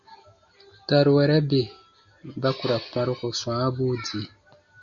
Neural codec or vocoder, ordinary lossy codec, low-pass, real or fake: none; Opus, 64 kbps; 7.2 kHz; real